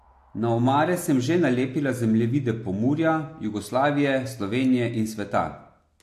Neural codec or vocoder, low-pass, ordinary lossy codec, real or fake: none; 14.4 kHz; AAC, 64 kbps; real